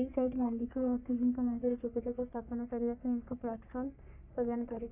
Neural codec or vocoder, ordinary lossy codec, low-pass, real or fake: codec, 44.1 kHz, 3.4 kbps, Pupu-Codec; none; 3.6 kHz; fake